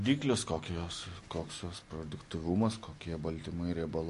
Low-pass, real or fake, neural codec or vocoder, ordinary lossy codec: 14.4 kHz; real; none; MP3, 48 kbps